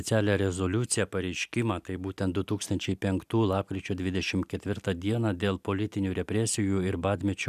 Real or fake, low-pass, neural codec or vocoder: real; 14.4 kHz; none